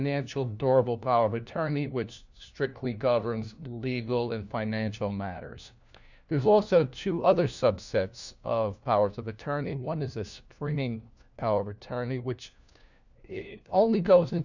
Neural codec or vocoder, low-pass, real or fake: codec, 16 kHz, 1 kbps, FunCodec, trained on LibriTTS, 50 frames a second; 7.2 kHz; fake